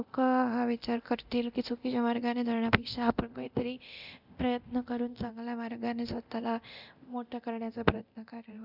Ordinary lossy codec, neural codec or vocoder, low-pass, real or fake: none; codec, 24 kHz, 0.9 kbps, DualCodec; 5.4 kHz; fake